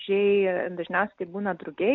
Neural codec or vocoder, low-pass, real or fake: none; 7.2 kHz; real